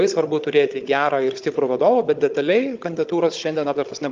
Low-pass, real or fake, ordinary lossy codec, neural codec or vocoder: 7.2 kHz; fake; Opus, 24 kbps; codec, 16 kHz, 16 kbps, FunCodec, trained on Chinese and English, 50 frames a second